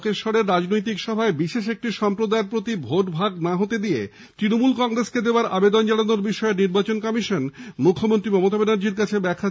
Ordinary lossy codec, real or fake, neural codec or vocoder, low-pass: none; real; none; none